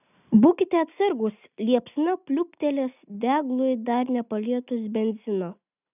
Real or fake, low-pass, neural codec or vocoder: real; 3.6 kHz; none